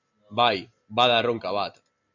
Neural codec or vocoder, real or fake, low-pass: none; real; 7.2 kHz